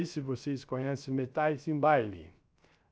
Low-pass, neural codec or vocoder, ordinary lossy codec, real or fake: none; codec, 16 kHz, 0.3 kbps, FocalCodec; none; fake